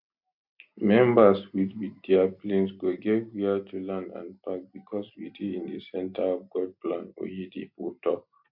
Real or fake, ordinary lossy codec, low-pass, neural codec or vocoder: real; MP3, 48 kbps; 5.4 kHz; none